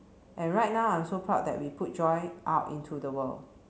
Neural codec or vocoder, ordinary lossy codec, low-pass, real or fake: none; none; none; real